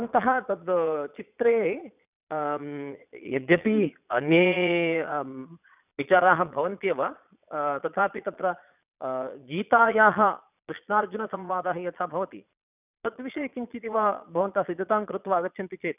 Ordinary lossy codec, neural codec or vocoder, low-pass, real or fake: none; vocoder, 22.05 kHz, 80 mel bands, Vocos; 3.6 kHz; fake